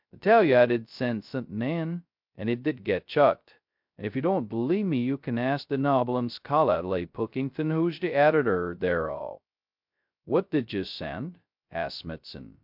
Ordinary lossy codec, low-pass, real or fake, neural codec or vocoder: MP3, 48 kbps; 5.4 kHz; fake; codec, 16 kHz, 0.2 kbps, FocalCodec